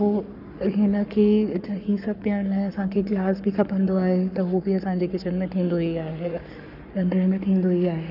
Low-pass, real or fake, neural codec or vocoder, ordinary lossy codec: 5.4 kHz; fake; codec, 44.1 kHz, 7.8 kbps, Pupu-Codec; none